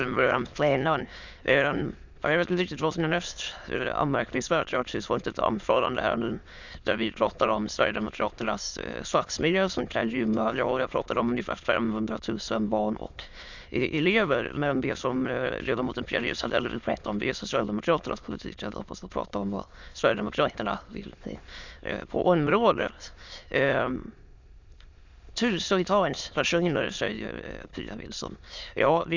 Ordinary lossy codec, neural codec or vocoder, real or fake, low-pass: Opus, 64 kbps; autoencoder, 22.05 kHz, a latent of 192 numbers a frame, VITS, trained on many speakers; fake; 7.2 kHz